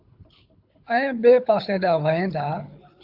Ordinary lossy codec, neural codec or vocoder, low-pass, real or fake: AAC, 48 kbps; codec, 24 kHz, 6 kbps, HILCodec; 5.4 kHz; fake